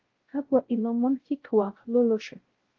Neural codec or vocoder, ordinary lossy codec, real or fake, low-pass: codec, 16 kHz, 0.5 kbps, X-Codec, WavLM features, trained on Multilingual LibriSpeech; Opus, 16 kbps; fake; 7.2 kHz